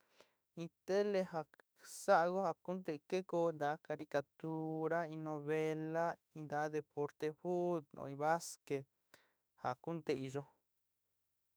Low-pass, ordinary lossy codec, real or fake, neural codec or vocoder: none; none; fake; autoencoder, 48 kHz, 32 numbers a frame, DAC-VAE, trained on Japanese speech